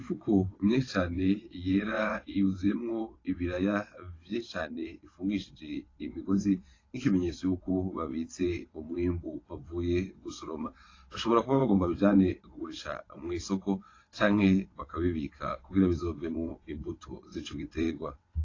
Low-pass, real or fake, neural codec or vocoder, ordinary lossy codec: 7.2 kHz; fake; vocoder, 22.05 kHz, 80 mel bands, WaveNeXt; AAC, 32 kbps